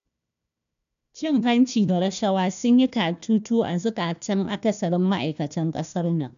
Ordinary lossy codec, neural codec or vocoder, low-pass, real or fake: AAC, 96 kbps; codec, 16 kHz, 1 kbps, FunCodec, trained on Chinese and English, 50 frames a second; 7.2 kHz; fake